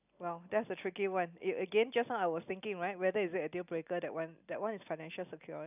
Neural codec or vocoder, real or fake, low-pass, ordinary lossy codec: none; real; 3.6 kHz; none